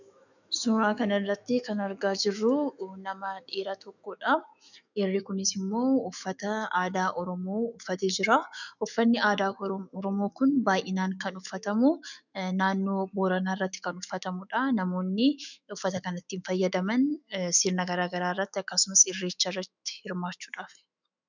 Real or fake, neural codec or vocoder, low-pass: fake; autoencoder, 48 kHz, 128 numbers a frame, DAC-VAE, trained on Japanese speech; 7.2 kHz